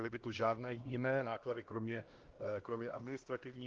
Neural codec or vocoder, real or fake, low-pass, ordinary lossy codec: codec, 16 kHz, 1 kbps, X-Codec, HuBERT features, trained on LibriSpeech; fake; 7.2 kHz; Opus, 16 kbps